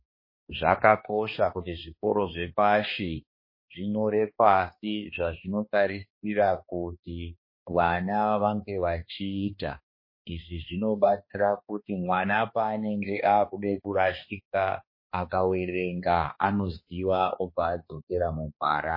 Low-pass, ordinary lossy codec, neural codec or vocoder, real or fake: 5.4 kHz; MP3, 24 kbps; codec, 16 kHz, 2 kbps, X-Codec, HuBERT features, trained on balanced general audio; fake